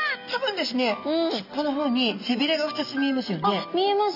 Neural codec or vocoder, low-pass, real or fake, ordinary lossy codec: none; 5.4 kHz; real; none